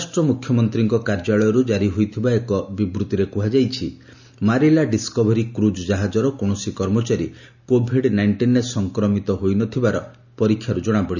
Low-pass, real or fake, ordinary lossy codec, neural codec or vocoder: 7.2 kHz; real; none; none